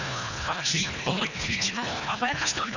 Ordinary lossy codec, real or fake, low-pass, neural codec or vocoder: none; fake; 7.2 kHz; codec, 24 kHz, 1.5 kbps, HILCodec